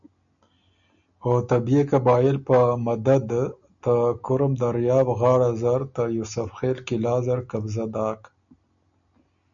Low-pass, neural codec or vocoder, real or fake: 7.2 kHz; none; real